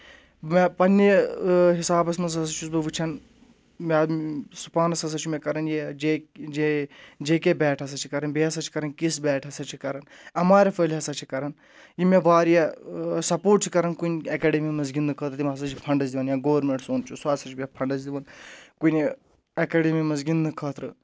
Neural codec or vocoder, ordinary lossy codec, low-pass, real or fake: none; none; none; real